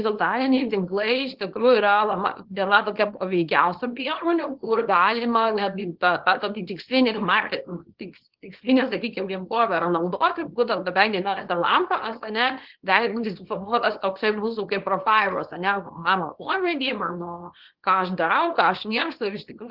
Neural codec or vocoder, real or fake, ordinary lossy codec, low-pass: codec, 24 kHz, 0.9 kbps, WavTokenizer, small release; fake; Opus, 16 kbps; 5.4 kHz